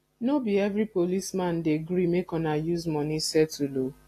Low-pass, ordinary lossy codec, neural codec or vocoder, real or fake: 14.4 kHz; AAC, 48 kbps; none; real